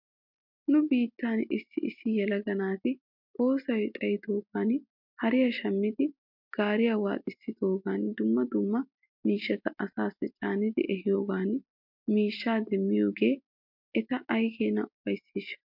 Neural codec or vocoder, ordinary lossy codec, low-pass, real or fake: none; MP3, 48 kbps; 5.4 kHz; real